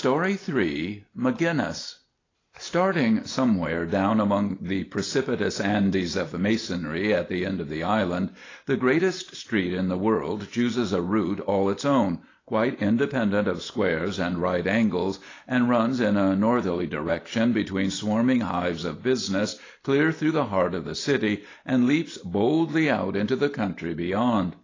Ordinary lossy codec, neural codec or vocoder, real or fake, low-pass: AAC, 32 kbps; none; real; 7.2 kHz